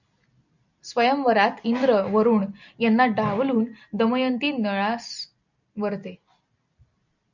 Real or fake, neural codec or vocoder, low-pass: real; none; 7.2 kHz